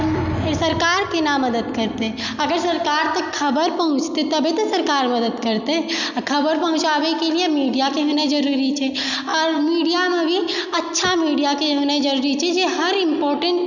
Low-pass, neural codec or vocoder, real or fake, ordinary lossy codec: 7.2 kHz; none; real; none